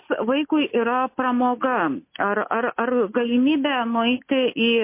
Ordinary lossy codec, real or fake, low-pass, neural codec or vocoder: MP3, 24 kbps; real; 3.6 kHz; none